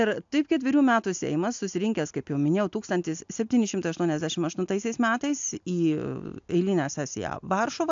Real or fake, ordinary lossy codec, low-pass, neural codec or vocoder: real; AAC, 64 kbps; 7.2 kHz; none